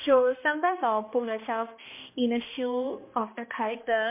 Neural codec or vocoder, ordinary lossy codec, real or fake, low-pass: codec, 16 kHz, 1 kbps, X-Codec, HuBERT features, trained on balanced general audio; MP3, 24 kbps; fake; 3.6 kHz